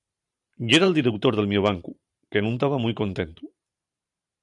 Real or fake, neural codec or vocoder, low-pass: fake; vocoder, 44.1 kHz, 128 mel bands every 512 samples, BigVGAN v2; 10.8 kHz